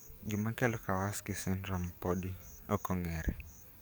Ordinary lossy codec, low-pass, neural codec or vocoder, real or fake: none; none; codec, 44.1 kHz, 7.8 kbps, DAC; fake